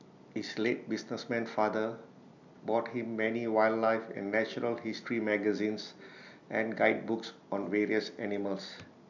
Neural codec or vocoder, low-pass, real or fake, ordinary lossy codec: none; 7.2 kHz; real; none